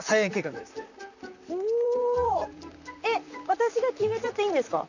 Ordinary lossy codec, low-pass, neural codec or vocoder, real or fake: none; 7.2 kHz; vocoder, 44.1 kHz, 128 mel bands, Pupu-Vocoder; fake